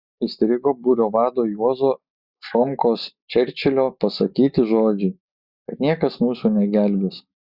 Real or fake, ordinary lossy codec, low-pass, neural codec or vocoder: real; Opus, 64 kbps; 5.4 kHz; none